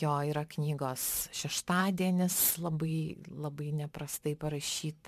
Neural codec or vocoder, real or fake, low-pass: vocoder, 44.1 kHz, 128 mel bands every 512 samples, BigVGAN v2; fake; 14.4 kHz